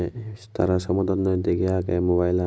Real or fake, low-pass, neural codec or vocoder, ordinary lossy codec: real; none; none; none